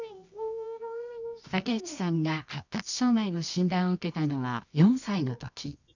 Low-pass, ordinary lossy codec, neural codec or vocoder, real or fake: 7.2 kHz; none; codec, 24 kHz, 0.9 kbps, WavTokenizer, medium music audio release; fake